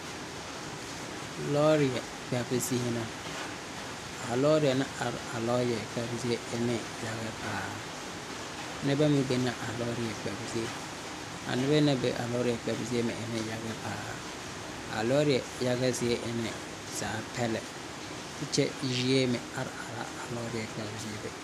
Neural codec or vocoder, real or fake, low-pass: none; real; 14.4 kHz